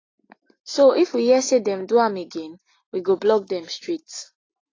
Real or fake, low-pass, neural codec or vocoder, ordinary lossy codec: real; 7.2 kHz; none; AAC, 32 kbps